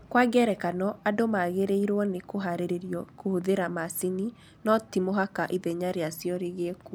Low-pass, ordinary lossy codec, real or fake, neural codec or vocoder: none; none; real; none